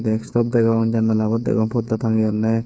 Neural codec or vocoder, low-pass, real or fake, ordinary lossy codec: codec, 16 kHz, 8 kbps, FreqCodec, smaller model; none; fake; none